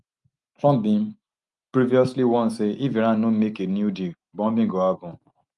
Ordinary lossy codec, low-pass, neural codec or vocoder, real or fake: Opus, 32 kbps; 10.8 kHz; none; real